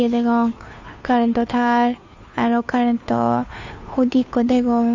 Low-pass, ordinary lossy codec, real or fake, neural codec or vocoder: 7.2 kHz; AAC, 48 kbps; fake; codec, 16 kHz, 2 kbps, FunCodec, trained on Chinese and English, 25 frames a second